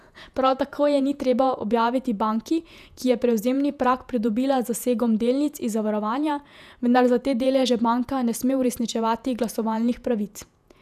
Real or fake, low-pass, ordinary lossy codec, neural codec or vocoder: fake; 14.4 kHz; none; vocoder, 48 kHz, 128 mel bands, Vocos